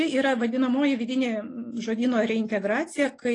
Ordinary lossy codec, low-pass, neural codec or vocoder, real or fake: AAC, 32 kbps; 10.8 kHz; none; real